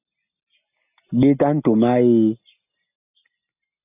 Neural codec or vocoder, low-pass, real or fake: none; 3.6 kHz; real